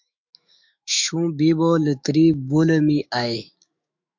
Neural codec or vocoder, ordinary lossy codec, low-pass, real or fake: autoencoder, 48 kHz, 128 numbers a frame, DAC-VAE, trained on Japanese speech; MP3, 48 kbps; 7.2 kHz; fake